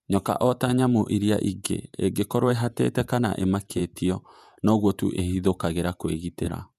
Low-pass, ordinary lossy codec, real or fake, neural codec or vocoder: 14.4 kHz; none; fake; vocoder, 48 kHz, 128 mel bands, Vocos